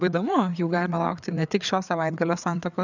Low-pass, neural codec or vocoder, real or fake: 7.2 kHz; codec, 16 kHz, 4 kbps, FreqCodec, larger model; fake